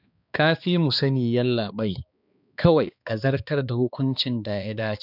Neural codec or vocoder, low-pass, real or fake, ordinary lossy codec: codec, 16 kHz, 2 kbps, X-Codec, HuBERT features, trained on balanced general audio; 5.4 kHz; fake; none